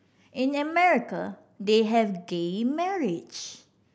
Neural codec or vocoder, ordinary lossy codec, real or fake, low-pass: none; none; real; none